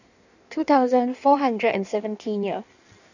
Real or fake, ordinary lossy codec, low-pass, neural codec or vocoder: fake; none; 7.2 kHz; codec, 16 kHz in and 24 kHz out, 1.1 kbps, FireRedTTS-2 codec